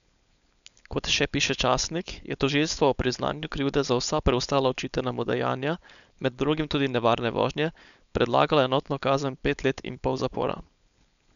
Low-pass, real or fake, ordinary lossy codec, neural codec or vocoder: 7.2 kHz; fake; none; codec, 16 kHz, 4.8 kbps, FACodec